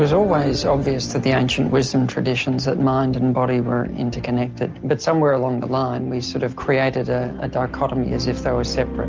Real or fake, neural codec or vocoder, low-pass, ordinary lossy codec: real; none; 7.2 kHz; Opus, 24 kbps